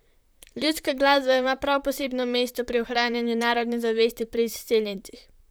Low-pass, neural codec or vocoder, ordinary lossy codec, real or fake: none; vocoder, 44.1 kHz, 128 mel bands, Pupu-Vocoder; none; fake